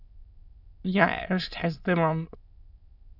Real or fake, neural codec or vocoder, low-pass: fake; autoencoder, 22.05 kHz, a latent of 192 numbers a frame, VITS, trained on many speakers; 5.4 kHz